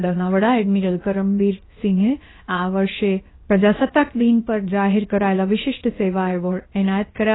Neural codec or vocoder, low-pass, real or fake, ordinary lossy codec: codec, 16 kHz, 0.7 kbps, FocalCodec; 7.2 kHz; fake; AAC, 16 kbps